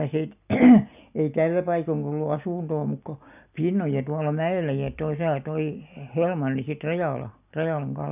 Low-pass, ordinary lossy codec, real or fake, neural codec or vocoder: 3.6 kHz; none; real; none